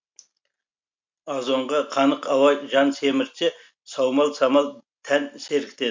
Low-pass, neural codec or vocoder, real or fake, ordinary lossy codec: 7.2 kHz; none; real; MP3, 48 kbps